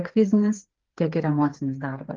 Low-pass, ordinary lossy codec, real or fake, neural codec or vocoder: 7.2 kHz; Opus, 16 kbps; fake; codec, 16 kHz, 8 kbps, FreqCodec, smaller model